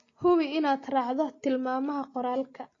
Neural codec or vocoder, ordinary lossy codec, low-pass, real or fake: none; AAC, 32 kbps; 7.2 kHz; real